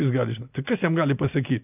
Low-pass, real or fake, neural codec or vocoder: 3.6 kHz; real; none